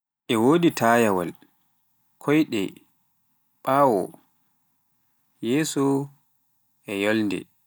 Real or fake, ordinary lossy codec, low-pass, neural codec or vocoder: real; none; none; none